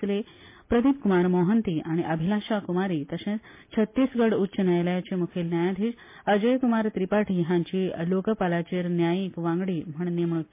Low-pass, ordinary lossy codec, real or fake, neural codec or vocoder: 3.6 kHz; MP3, 24 kbps; real; none